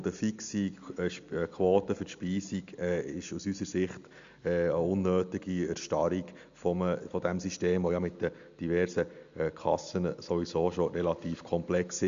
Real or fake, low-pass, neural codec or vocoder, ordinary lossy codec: real; 7.2 kHz; none; MP3, 64 kbps